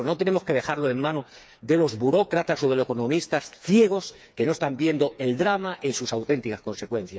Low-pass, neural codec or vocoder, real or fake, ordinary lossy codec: none; codec, 16 kHz, 4 kbps, FreqCodec, smaller model; fake; none